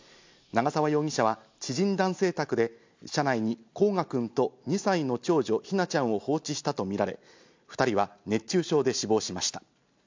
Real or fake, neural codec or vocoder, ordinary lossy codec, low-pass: real; none; MP3, 64 kbps; 7.2 kHz